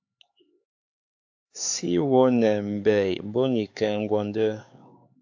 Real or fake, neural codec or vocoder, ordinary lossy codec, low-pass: fake; codec, 16 kHz, 4 kbps, X-Codec, HuBERT features, trained on LibriSpeech; AAC, 48 kbps; 7.2 kHz